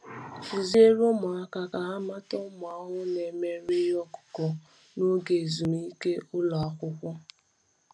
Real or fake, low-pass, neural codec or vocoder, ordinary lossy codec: real; none; none; none